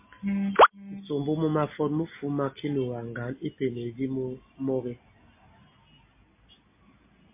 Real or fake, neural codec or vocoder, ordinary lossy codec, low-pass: real; none; MP3, 24 kbps; 3.6 kHz